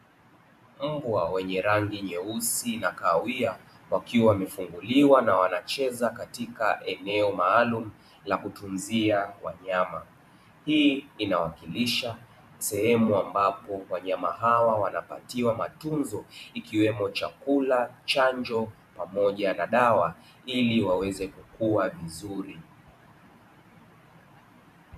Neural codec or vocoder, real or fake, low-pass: vocoder, 44.1 kHz, 128 mel bands every 512 samples, BigVGAN v2; fake; 14.4 kHz